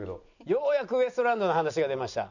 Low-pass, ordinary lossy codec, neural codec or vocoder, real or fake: 7.2 kHz; none; none; real